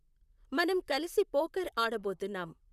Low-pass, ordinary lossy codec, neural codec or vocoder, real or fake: 14.4 kHz; none; vocoder, 44.1 kHz, 128 mel bands, Pupu-Vocoder; fake